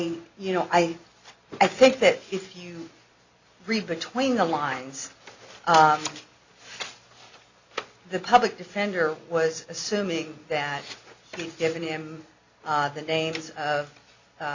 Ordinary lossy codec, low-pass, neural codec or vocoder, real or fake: Opus, 64 kbps; 7.2 kHz; none; real